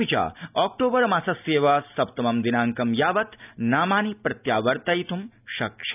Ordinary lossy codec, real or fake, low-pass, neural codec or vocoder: none; real; 3.6 kHz; none